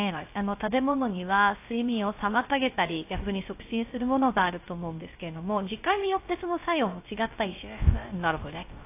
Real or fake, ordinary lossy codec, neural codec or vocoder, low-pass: fake; AAC, 24 kbps; codec, 16 kHz, 0.3 kbps, FocalCodec; 3.6 kHz